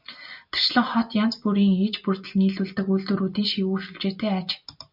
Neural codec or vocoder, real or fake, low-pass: none; real; 5.4 kHz